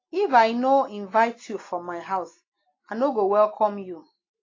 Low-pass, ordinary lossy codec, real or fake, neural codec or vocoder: 7.2 kHz; AAC, 32 kbps; real; none